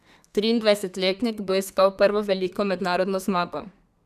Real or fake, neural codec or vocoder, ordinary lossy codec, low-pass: fake; codec, 32 kHz, 1.9 kbps, SNAC; none; 14.4 kHz